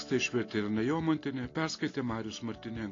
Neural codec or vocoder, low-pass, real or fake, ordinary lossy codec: none; 7.2 kHz; real; AAC, 32 kbps